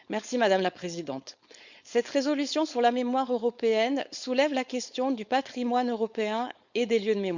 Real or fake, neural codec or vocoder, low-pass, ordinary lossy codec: fake; codec, 16 kHz, 4.8 kbps, FACodec; 7.2 kHz; Opus, 64 kbps